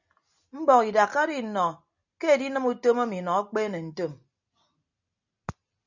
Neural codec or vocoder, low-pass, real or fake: none; 7.2 kHz; real